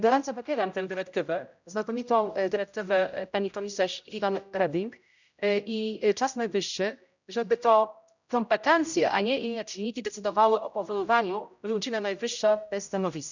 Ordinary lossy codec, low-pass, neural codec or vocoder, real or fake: none; 7.2 kHz; codec, 16 kHz, 0.5 kbps, X-Codec, HuBERT features, trained on general audio; fake